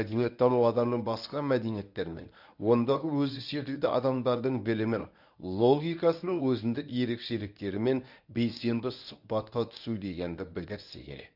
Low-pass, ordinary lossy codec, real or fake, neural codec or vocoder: 5.4 kHz; none; fake; codec, 24 kHz, 0.9 kbps, WavTokenizer, medium speech release version 1